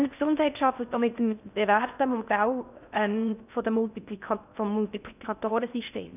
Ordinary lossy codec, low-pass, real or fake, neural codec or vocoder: none; 3.6 kHz; fake; codec, 16 kHz in and 24 kHz out, 0.6 kbps, FocalCodec, streaming, 4096 codes